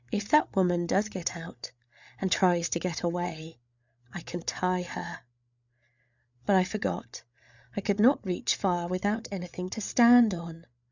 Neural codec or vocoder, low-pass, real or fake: codec, 16 kHz, 8 kbps, FreqCodec, larger model; 7.2 kHz; fake